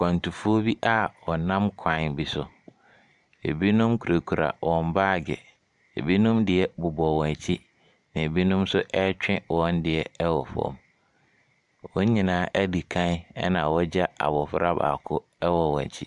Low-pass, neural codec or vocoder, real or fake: 10.8 kHz; vocoder, 44.1 kHz, 128 mel bands every 512 samples, BigVGAN v2; fake